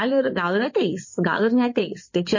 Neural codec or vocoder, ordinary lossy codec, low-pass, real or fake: codec, 16 kHz in and 24 kHz out, 2.2 kbps, FireRedTTS-2 codec; MP3, 32 kbps; 7.2 kHz; fake